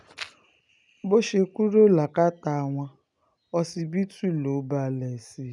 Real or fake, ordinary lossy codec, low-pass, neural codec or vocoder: real; none; 10.8 kHz; none